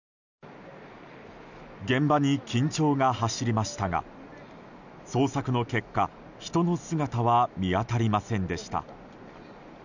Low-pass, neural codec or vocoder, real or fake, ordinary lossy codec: 7.2 kHz; none; real; none